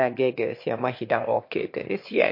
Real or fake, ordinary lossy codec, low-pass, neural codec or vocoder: fake; MP3, 32 kbps; 5.4 kHz; autoencoder, 22.05 kHz, a latent of 192 numbers a frame, VITS, trained on one speaker